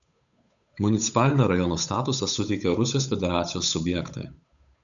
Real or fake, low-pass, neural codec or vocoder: fake; 7.2 kHz; codec, 16 kHz, 8 kbps, FunCodec, trained on Chinese and English, 25 frames a second